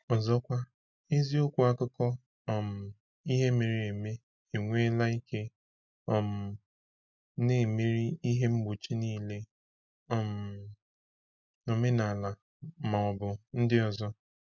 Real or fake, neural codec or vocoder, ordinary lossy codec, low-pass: real; none; none; 7.2 kHz